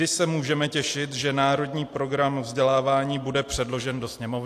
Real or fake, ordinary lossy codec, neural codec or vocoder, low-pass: real; AAC, 64 kbps; none; 14.4 kHz